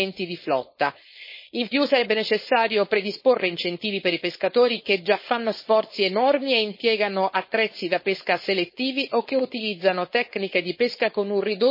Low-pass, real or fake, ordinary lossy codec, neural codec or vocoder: 5.4 kHz; fake; MP3, 24 kbps; codec, 16 kHz, 4.8 kbps, FACodec